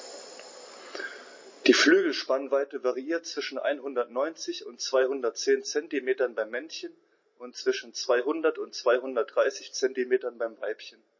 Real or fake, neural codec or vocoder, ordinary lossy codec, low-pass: real; none; MP3, 32 kbps; 7.2 kHz